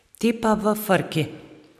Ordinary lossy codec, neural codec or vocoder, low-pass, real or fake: none; none; 14.4 kHz; real